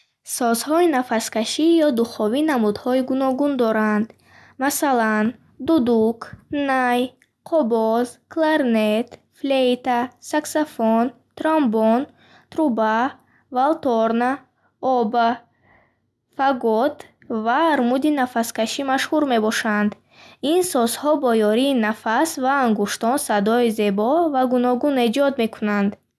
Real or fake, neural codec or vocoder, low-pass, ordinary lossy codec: real; none; none; none